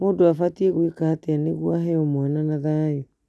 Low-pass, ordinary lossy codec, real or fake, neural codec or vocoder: none; none; real; none